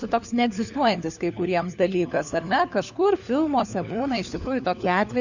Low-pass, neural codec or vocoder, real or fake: 7.2 kHz; codec, 16 kHz, 4 kbps, FunCodec, trained on LibriTTS, 50 frames a second; fake